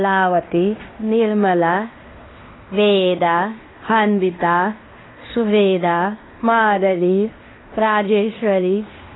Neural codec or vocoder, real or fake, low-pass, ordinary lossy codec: codec, 16 kHz in and 24 kHz out, 0.9 kbps, LongCat-Audio-Codec, fine tuned four codebook decoder; fake; 7.2 kHz; AAC, 16 kbps